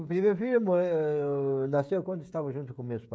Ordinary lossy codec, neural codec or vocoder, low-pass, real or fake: none; codec, 16 kHz, 16 kbps, FreqCodec, smaller model; none; fake